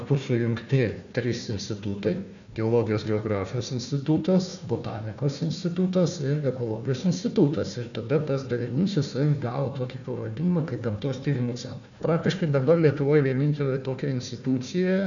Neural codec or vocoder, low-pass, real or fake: codec, 16 kHz, 1 kbps, FunCodec, trained on Chinese and English, 50 frames a second; 7.2 kHz; fake